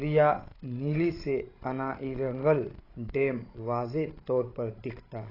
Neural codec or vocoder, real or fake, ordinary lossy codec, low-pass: codec, 16 kHz, 16 kbps, FreqCodec, larger model; fake; AAC, 24 kbps; 5.4 kHz